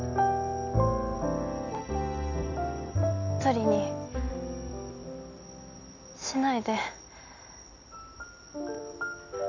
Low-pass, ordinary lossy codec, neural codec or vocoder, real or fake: 7.2 kHz; none; none; real